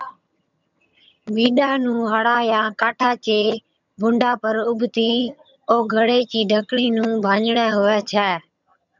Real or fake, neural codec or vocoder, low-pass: fake; vocoder, 22.05 kHz, 80 mel bands, HiFi-GAN; 7.2 kHz